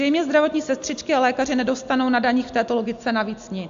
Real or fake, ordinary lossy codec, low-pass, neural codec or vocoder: real; AAC, 64 kbps; 7.2 kHz; none